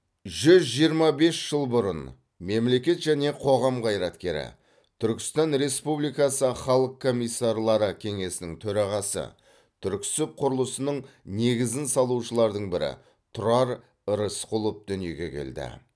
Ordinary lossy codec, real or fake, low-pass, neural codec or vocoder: none; real; none; none